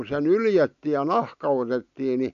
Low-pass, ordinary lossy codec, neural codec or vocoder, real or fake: 7.2 kHz; AAC, 64 kbps; none; real